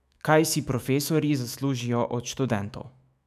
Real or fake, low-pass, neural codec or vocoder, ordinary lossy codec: fake; 14.4 kHz; autoencoder, 48 kHz, 128 numbers a frame, DAC-VAE, trained on Japanese speech; none